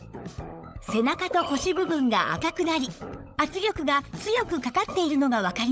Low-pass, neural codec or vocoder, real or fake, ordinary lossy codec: none; codec, 16 kHz, 16 kbps, FunCodec, trained on LibriTTS, 50 frames a second; fake; none